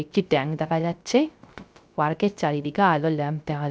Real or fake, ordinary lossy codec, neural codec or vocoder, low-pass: fake; none; codec, 16 kHz, 0.3 kbps, FocalCodec; none